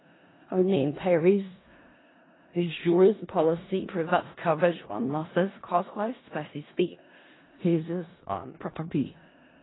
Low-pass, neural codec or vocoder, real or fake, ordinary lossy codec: 7.2 kHz; codec, 16 kHz in and 24 kHz out, 0.4 kbps, LongCat-Audio-Codec, four codebook decoder; fake; AAC, 16 kbps